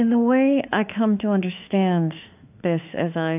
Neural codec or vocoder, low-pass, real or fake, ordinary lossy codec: autoencoder, 48 kHz, 32 numbers a frame, DAC-VAE, trained on Japanese speech; 3.6 kHz; fake; AAC, 32 kbps